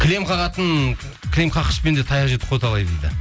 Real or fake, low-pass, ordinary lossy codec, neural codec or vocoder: real; none; none; none